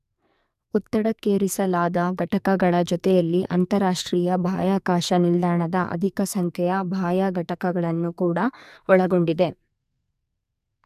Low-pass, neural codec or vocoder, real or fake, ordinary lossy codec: 14.4 kHz; codec, 44.1 kHz, 2.6 kbps, SNAC; fake; none